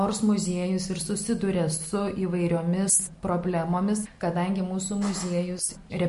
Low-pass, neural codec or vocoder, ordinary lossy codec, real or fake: 14.4 kHz; none; MP3, 48 kbps; real